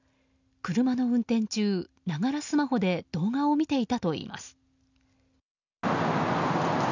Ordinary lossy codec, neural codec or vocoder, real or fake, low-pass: none; none; real; 7.2 kHz